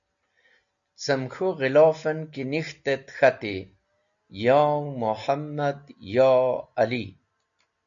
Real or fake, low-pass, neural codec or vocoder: real; 7.2 kHz; none